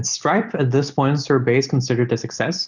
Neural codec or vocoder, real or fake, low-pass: none; real; 7.2 kHz